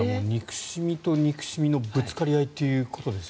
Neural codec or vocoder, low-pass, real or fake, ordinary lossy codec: none; none; real; none